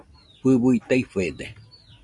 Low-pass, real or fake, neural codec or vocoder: 10.8 kHz; real; none